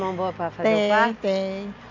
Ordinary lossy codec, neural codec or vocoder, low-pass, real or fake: MP3, 48 kbps; none; 7.2 kHz; real